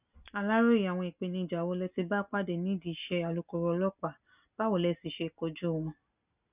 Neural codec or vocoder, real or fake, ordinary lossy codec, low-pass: none; real; none; 3.6 kHz